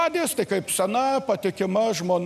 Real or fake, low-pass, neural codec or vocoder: real; 14.4 kHz; none